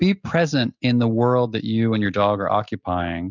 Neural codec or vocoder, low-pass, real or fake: none; 7.2 kHz; real